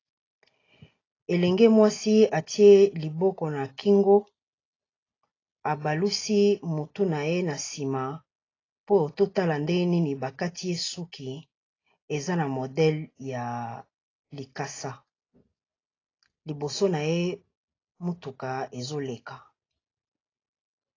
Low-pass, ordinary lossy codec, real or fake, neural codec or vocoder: 7.2 kHz; AAC, 32 kbps; real; none